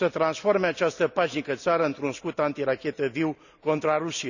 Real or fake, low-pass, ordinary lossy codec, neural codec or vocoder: real; 7.2 kHz; none; none